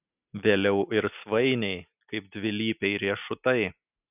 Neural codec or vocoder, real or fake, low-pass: none; real; 3.6 kHz